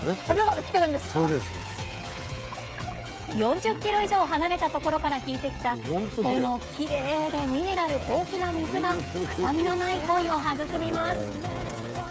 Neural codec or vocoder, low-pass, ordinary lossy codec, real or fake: codec, 16 kHz, 8 kbps, FreqCodec, smaller model; none; none; fake